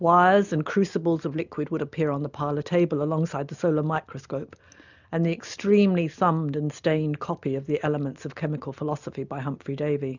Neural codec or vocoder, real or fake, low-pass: none; real; 7.2 kHz